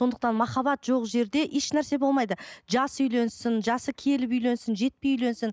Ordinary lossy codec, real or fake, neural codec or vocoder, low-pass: none; real; none; none